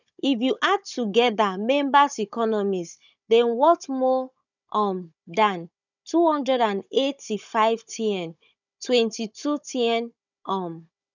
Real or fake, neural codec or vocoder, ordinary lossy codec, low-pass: fake; codec, 16 kHz, 16 kbps, FunCodec, trained on Chinese and English, 50 frames a second; none; 7.2 kHz